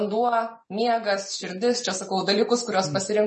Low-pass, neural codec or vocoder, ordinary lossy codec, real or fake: 9.9 kHz; none; MP3, 32 kbps; real